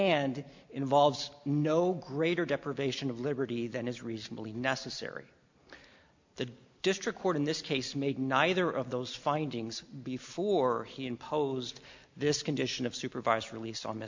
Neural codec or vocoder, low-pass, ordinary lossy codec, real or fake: none; 7.2 kHz; MP3, 48 kbps; real